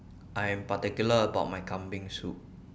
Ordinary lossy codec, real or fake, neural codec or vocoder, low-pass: none; real; none; none